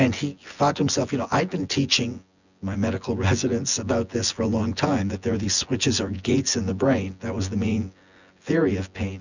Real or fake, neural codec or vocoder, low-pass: fake; vocoder, 24 kHz, 100 mel bands, Vocos; 7.2 kHz